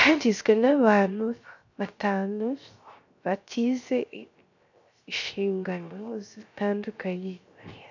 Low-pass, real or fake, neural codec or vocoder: 7.2 kHz; fake; codec, 16 kHz, 0.3 kbps, FocalCodec